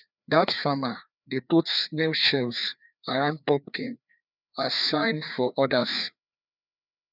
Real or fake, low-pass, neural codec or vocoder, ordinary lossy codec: fake; 5.4 kHz; codec, 16 kHz, 2 kbps, FreqCodec, larger model; none